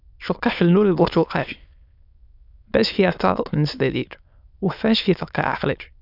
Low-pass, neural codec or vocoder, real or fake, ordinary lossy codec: 5.4 kHz; autoencoder, 22.05 kHz, a latent of 192 numbers a frame, VITS, trained on many speakers; fake; none